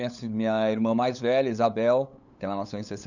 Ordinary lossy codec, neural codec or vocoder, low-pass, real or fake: none; codec, 16 kHz, 8 kbps, FunCodec, trained on LibriTTS, 25 frames a second; 7.2 kHz; fake